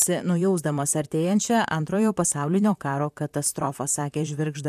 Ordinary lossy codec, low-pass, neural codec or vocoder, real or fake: AAC, 96 kbps; 14.4 kHz; vocoder, 44.1 kHz, 128 mel bands, Pupu-Vocoder; fake